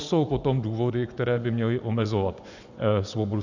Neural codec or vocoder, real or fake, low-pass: vocoder, 24 kHz, 100 mel bands, Vocos; fake; 7.2 kHz